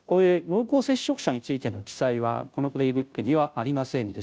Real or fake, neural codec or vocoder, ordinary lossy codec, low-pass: fake; codec, 16 kHz, 0.5 kbps, FunCodec, trained on Chinese and English, 25 frames a second; none; none